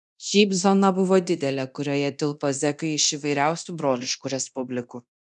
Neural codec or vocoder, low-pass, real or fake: codec, 24 kHz, 0.9 kbps, DualCodec; 10.8 kHz; fake